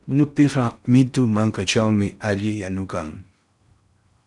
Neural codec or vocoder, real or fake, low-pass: codec, 16 kHz in and 24 kHz out, 0.6 kbps, FocalCodec, streaming, 4096 codes; fake; 10.8 kHz